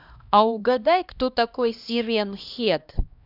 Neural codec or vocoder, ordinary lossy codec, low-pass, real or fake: codec, 16 kHz, 1 kbps, X-Codec, HuBERT features, trained on LibriSpeech; none; 5.4 kHz; fake